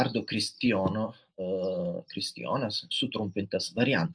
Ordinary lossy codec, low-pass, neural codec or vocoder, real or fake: AAC, 64 kbps; 9.9 kHz; none; real